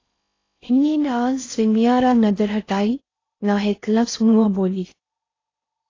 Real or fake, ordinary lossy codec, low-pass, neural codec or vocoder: fake; AAC, 32 kbps; 7.2 kHz; codec, 16 kHz in and 24 kHz out, 0.6 kbps, FocalCodec, streaming, 4096 codes